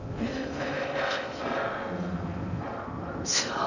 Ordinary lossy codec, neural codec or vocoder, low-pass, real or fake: none; codec, 16 kHz in and 24 kHz out, 0.6 kbps, FocalCodec, streaming, 4096 codes; 7.2 kHz; fake